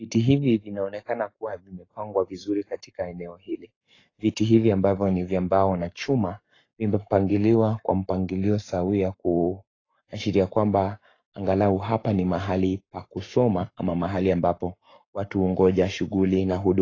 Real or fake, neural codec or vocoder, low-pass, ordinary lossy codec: fake; codec, 44.1 kHz, 7.8 kbps, Pupu-Codec; 7.2 kHz; AAC, 32 kbps